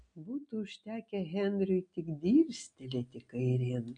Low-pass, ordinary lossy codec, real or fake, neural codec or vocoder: 9.9 kHz; MP3, 64 kbps; real; none